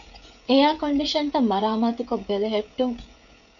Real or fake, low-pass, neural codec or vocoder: fake; 7.2 kHz; codec, 16 kHz, 16 kbps, FreqCodec, smaller model